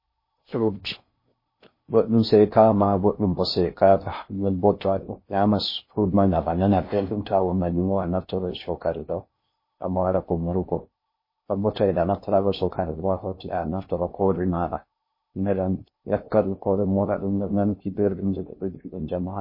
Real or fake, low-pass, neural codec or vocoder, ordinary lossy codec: fake; 5.4 kHz; codec, 16 kHz in and 24 kHz out, 0.6 kbps, FocalCodec, streaming, 4096 codes; MP3, 24 kbps